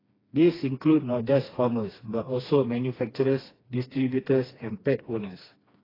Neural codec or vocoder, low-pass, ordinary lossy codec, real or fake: codec, 16 kHz, 2 kbps, FreqCodec, smaller model; 5.4 kHz; AAC, 24 kbps; fake